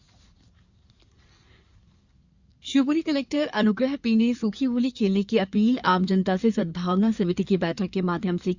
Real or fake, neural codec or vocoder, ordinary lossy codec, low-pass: fake; codec, 16 kHz in and 24 kHz out, 2.2 kbps, FireRedTTS-2 codec; Opus, 64 kbps; 7.2 kHz